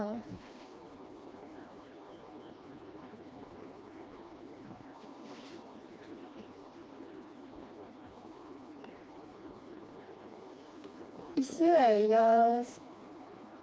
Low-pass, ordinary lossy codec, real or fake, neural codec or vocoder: none; none; fake; codec, 16 kHz, 2 kbps, FreqCodec, smaller model